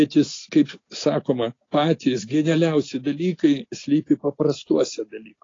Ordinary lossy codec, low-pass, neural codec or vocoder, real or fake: AAC, 32 kbps; 7.2 kHz; none; real